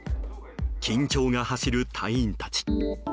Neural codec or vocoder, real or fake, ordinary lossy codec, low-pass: none; real; none; none